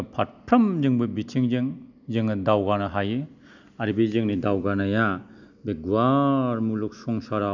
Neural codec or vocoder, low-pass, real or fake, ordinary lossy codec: none; 7.2 kHz; real; none